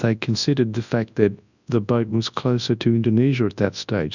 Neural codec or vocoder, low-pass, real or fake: codec, 24 kHz, 0.9 kbps, WavTokenizer, large speech release; 7.2 kHz; fake